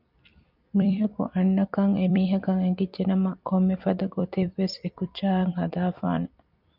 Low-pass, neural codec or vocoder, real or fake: 5.4 kHz; vocoder, 44.1 kHz, 128 mel bands every 256 samples, BigVGAN v2; fake